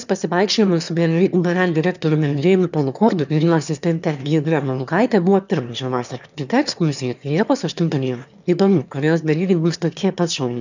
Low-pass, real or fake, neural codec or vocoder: 7.2 kHz; fake; autoencoder, 22.05 kHz, a latent of 192 numbers a frame, VITS, trained on one speaker